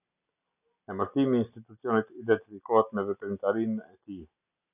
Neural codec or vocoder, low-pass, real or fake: none; 3.6 kHz; real